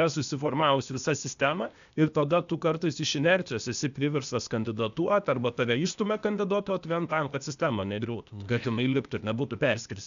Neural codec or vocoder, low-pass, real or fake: codec, 16 kHz, 0.8 kbps, ZipCodec; 7.2 kHz; fake